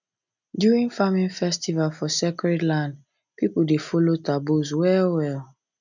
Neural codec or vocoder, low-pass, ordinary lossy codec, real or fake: none; 7.2 kHz; none; real